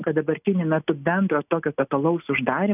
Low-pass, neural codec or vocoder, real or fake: 3.6 kHz; none; real